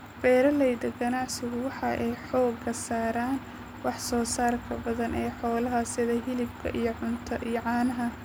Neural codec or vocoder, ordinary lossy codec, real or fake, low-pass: none; none; real; none